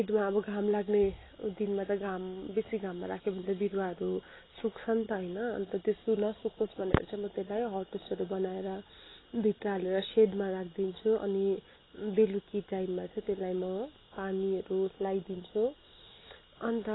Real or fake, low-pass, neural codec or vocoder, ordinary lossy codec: real; 7.2 kHz; none; AAC, 16 kbps